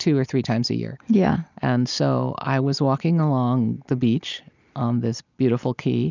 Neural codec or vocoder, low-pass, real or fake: none; 7.2 kHz; real